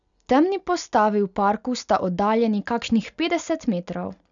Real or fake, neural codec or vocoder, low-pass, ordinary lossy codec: real; none; 7.2 kHz; none